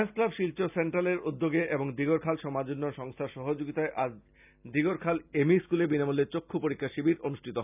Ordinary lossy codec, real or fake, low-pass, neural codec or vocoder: none; real; 3.6 kHz; none